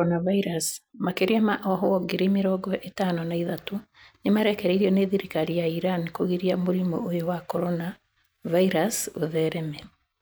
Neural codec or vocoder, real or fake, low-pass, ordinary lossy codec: none; real; none; none